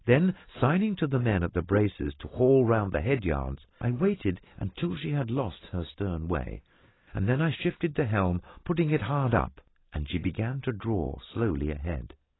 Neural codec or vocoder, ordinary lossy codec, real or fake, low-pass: none; AAC, 16 kbps; real; 7.2 kHz